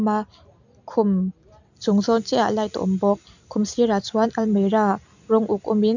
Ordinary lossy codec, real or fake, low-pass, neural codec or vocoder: none; real; 7.2 kHz; none